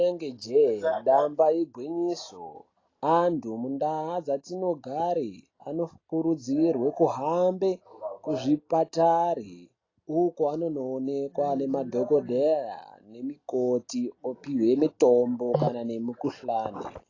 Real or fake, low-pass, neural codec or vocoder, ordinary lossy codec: real; 7.2 kHz; none; AAC, 32 kbps